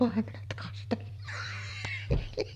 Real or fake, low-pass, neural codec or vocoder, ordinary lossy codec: fake; 14.4 kHz; vocoder, 44.1 kHz, 128 mel bands every 256 samples, BigVGAN v2; none